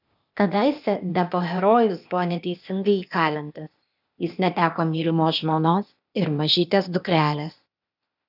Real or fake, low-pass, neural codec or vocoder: fake; 5.4 kHz; codec, 16 kHz, 0.8 kbps, ZipCodec